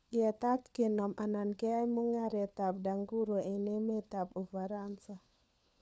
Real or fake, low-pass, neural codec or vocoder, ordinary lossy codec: fake; none; codec, 16 kHz, 4 kbps, FunCodec, trained on LibriTTS, 50 frames a second; none